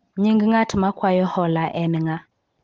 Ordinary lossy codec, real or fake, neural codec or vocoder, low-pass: Opus, 16 kbps; real; none; 7.2 kHz